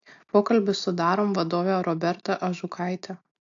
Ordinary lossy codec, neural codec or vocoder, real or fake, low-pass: AAC, 48 kbps; none; real; 7.2 kHz